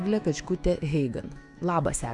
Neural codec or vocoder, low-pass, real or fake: autoencoder, 48 kHz, 128 numbers a frame, DAC-VAE, trained on Japanese speech; 10.8 kHz; fake